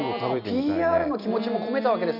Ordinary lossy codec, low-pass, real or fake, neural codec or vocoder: none; 5.4 kHz; real; none